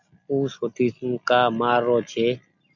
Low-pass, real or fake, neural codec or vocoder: 7.2 kHz; real; none